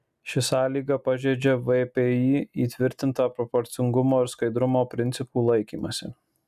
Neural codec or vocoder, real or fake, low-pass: none; real; 14.4 kHz